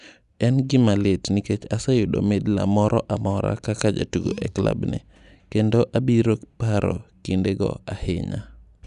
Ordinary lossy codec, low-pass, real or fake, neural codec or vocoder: none; 10.8 kHz; real; none